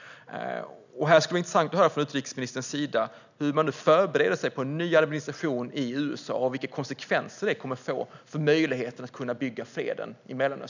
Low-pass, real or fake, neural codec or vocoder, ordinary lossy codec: 7.2 kHz; real; none; none